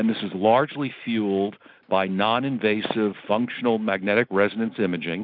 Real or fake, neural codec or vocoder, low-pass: real; none; 5.4 kHz